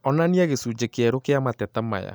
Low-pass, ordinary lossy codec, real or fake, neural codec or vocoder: none; none; real; none